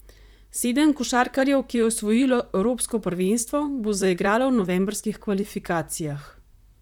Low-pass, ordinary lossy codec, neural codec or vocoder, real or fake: 19.8 kHz; none; vocoder, 44.1 kHz, 128 mel bands, Pupu-Vocoder; fake